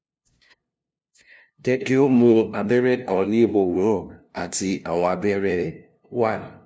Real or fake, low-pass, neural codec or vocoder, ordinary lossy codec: fake; none; codec, 16 kHz, 0.5 kbps, FunCodec, trained on LibriTTS, 25 frames a second; none